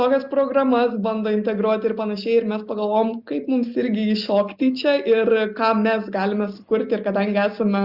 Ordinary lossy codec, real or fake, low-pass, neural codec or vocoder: Opus, 64 kbps; real; 5.4 kHz; none